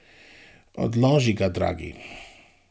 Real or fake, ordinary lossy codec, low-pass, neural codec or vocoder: real; none; none; none